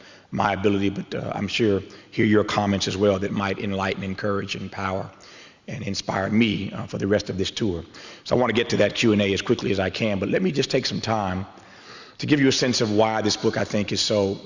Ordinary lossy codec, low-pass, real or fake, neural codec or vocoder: Opus, 64 kbps; 7.2 kHz; real; none